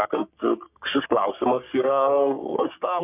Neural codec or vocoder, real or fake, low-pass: codec, 44.1 kHz, 3.4 kbps, Pupu-Codec; fake; 3.6 kHz